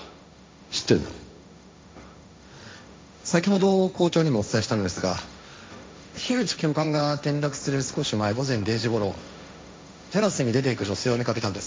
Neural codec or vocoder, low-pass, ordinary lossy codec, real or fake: codec, 16 kHz, 1.1 kbps, Voila-Tokenizer; none; none; fake